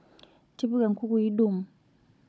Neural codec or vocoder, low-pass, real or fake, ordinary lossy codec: codec, 16 kHz, 16 kbps, FunCodec, trained on Chinese and English, 50 frames a second; none; fake; none